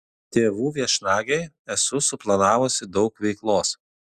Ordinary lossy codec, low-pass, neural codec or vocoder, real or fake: Opus, 64 kbps; 14.4 kHz; none; real